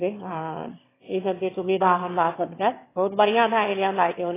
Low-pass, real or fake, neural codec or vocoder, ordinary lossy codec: 3.6 kHz; fake; autoencoder, 22.05 kHz, a latent of 192 numbers a frame, VITS, trained on one speaker; AAC, 16 kbps